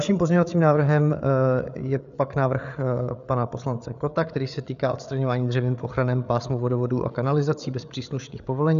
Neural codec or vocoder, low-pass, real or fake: codec, 16 kHz, 8 kbps, FreqCodec, larger model; 7.2 kHz; fake